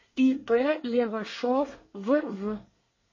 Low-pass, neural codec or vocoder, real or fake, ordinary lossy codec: 7.2 kHz; codec, 24 kHz, 1 kbps, SNAC; fake; MP3, 32 kbps